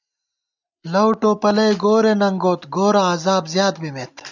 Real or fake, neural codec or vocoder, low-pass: real; none; 7.2 kHz